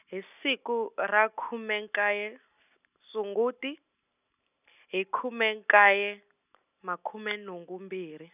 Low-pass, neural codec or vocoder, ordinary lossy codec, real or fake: 3.6 kHz; none; none; real